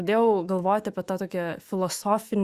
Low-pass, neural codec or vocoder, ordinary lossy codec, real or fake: 14.4 kHz; autoencoder, 48 kHz, 128 numbers a frame, DAC-VAE, trained on Japanese speech; Opus, 64 kbps; fake